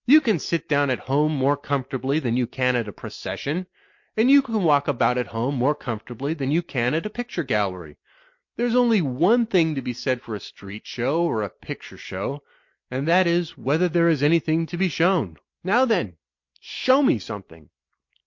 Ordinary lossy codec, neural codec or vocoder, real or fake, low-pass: MP3, 48 kbps; none; real; 7.2 kHz